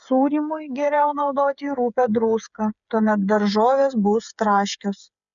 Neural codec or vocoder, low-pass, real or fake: codec, 16 kHz, 8 kbps, FreqCodec, smaller model; 7.2 kHz; fake